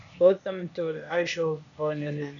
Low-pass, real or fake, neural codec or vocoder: 7.2 kHz; fake; codec, 16 kHz, 0.8 kbps, ZipCodec